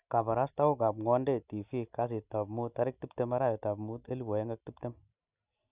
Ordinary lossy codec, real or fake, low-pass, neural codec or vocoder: Opus, 64 kbps; real; 3.6 kHz; none